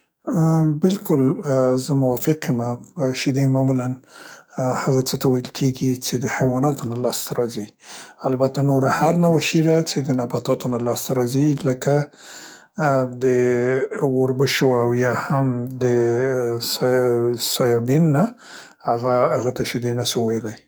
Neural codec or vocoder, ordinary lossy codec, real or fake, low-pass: codec, 44.1 kHz, 2.6 kbps, SNAC; none; fake; none